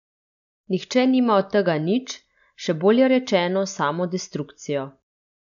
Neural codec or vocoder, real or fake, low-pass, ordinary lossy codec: none; real; 7.2 kHz; none